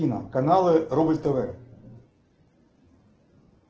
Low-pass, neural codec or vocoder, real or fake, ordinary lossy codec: 7.2 kHz; none; real; Opus, 16 kbps